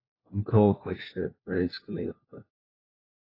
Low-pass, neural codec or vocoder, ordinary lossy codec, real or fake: 5.4 kHz; codec, 16 kHz, 1 kbps, FunCodec, trained on LibriTTS, 50 frames a second; AAC, 24 kbps; fake